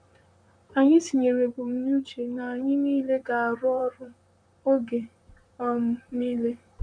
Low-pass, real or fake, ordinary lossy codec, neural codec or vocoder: 9.9 kHz; fake; none; vocoder, 44.1 kHz, 128 mel bands, Pupu-Vocoder